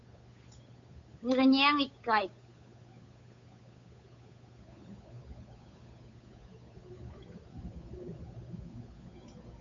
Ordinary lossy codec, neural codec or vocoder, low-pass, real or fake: AAC, 48 kbps; codec, 16 kHz, 8 kbps, FunCodec, trained on Chinese and English, 25 frames a second; 7.2 kHz; fake